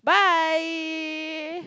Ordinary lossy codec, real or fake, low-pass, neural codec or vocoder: none; real; none; none